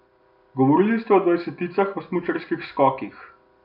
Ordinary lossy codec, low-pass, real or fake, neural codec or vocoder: none; 5.4 kHz; real; none